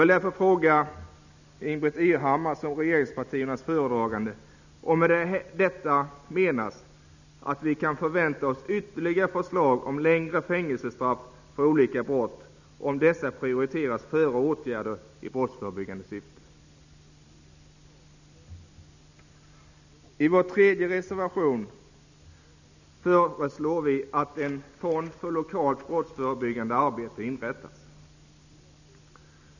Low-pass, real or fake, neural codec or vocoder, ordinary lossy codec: 7.2 kHz; real; none; none